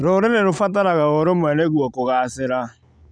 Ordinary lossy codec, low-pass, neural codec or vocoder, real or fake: none; 9.9 kHz; none; real